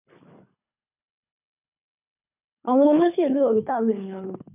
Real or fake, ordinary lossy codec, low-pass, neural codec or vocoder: fake; none; 3.6 kHz; codec, 24 kHz, 3 kbps, HILCodec